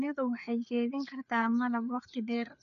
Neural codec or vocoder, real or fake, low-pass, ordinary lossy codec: codec, 16 kHz, 4 kbps, FreqCodec, larger model; fake; 7.2 kHz; none